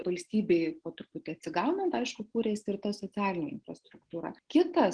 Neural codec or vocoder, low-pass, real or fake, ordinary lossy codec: none; 9.9 kHz; real; Opus, 16 kbps